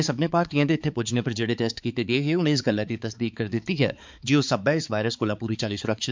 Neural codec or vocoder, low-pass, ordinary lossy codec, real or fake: codec, 16 kHz, 4 kbps, X-Codec, HuBERT features, trained on balanced general audio; 7.2 kHz; MP3, 64 kbps; fake